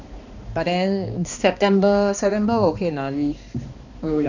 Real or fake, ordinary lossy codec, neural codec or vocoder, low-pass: fake; none; codec, 16 kHz, 2 kbps, X-Codec, HuBERT features, trained on balanced general audio; 7.2 kHz